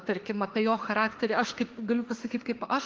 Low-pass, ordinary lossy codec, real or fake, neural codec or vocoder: 7.2 kHz; Opus, 16 kbps; fake; autoencoder, 48 kHz, 32 numbers a frame, DAC-VAE, trained on Japanese speech